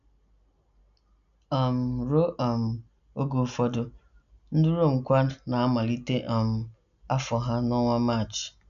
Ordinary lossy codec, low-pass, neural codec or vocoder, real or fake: none; 7.2 kHz; none; real